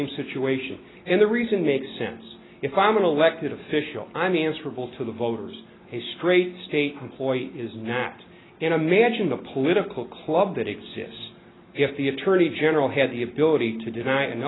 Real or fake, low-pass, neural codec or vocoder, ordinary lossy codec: real; 7.2 kHz; none; AAC, 16 kbps